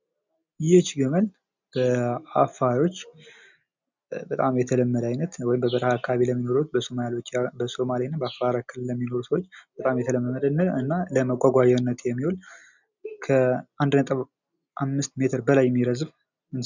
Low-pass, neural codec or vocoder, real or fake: 7.2 kHz; none; real